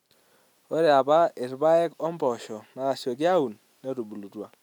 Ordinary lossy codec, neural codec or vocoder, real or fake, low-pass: none; none; real; 19.8 kHz